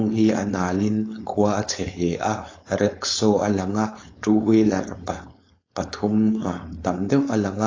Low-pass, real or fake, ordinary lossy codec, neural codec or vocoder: 7.2 kHz; fake; AAC, 32 kbps; codec, 16 kHz, 4.8 kbps, FACodec